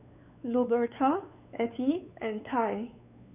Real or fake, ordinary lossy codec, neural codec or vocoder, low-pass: fake; none; codec, 16 kHz, 4 kbps, X-Codec, WavLM features, trained on Multilingual LibriSpeech; 3.6 kHz